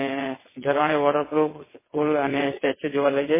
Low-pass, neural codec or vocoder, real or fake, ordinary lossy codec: 3.6 kHz; vocoder, 22.05 kHz, 80 mel bands, WaveNeXt; fake; MP3, 16 kbps